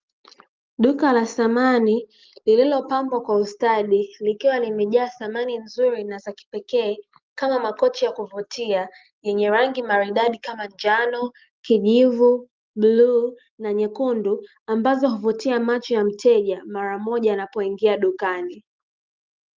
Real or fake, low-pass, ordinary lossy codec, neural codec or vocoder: real; 7.2 kHz; Opus, 32 kbps; none